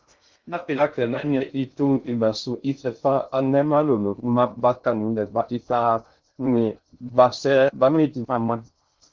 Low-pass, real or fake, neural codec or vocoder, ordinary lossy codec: 7.2 kHz; fake; codec, 16 kHz in and 24 kHz out, 0.6 kbps, FocalCodec, streaming, 2048 codes; Opus, 32 kbps